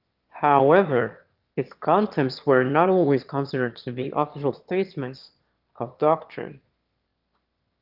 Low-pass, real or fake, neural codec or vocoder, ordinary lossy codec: 5.4 kHz; fake; autoencoder, 22.05 kHz, a latent of 192 numbers a frame, VITS, trained on one speaker; Opus, 32 kbps